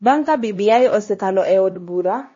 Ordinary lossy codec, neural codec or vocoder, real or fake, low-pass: MP3, 32 kbps; codec, 16 kHz, 1 kbps, X-Codec, HuBERT features, trained on LibriSpeech; fake; 7.2 kHz